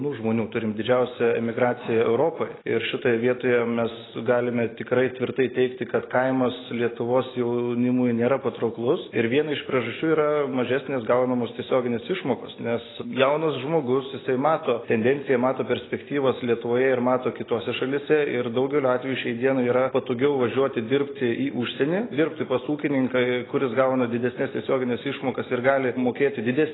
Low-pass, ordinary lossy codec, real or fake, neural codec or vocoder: 7.2 kHz; AAC, 16 kbps; real; none